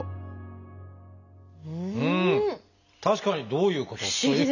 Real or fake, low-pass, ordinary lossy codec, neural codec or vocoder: real; 7.2 kHz; none; none